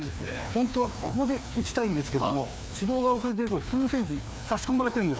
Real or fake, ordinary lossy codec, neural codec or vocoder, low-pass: fake; none; codec, 16 kHz, 2 kbps, FreqCodec, larger model; none